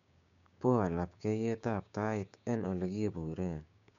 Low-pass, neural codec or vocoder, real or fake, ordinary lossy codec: 7.2 kHz; codec, 16 kHz, 6 kbps, DAC; fake; none